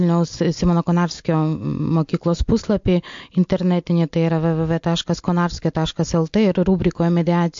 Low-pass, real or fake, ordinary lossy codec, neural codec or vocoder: 7.2 kHz; real; MP3, 48 kbps; none